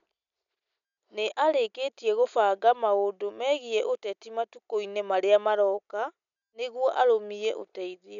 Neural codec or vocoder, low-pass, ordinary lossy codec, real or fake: none; 7.2 kHz; none; real